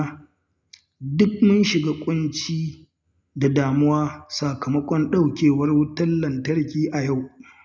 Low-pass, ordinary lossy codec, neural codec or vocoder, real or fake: none; none; none; real